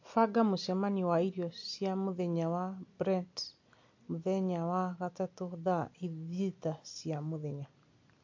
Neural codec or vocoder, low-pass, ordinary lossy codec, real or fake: none; 7.2 kHz; MP3, 48 kbps; real